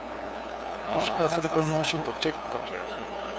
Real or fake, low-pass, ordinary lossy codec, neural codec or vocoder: fake; none; none; codec, 16 kHz, 2 kbps, FunCodec, trained on LibriTTS, 25 frames a second